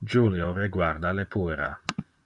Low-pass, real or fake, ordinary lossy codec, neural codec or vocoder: 10.8 kHz; fake; Opus, 64 kbps; vocoder, 24 kHz, 100 mel bands, Vocos